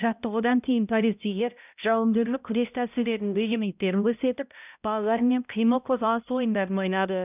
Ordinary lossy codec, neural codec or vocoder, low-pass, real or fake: none; codec, 16 kHz, 0.5 kbps, X-Codec, HuBERT features, trained on balanced general audio; 3.6 kHz; fake